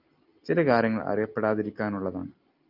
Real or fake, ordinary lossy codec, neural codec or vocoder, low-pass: real; Opus, 24 kbps; none; 5.4 kHz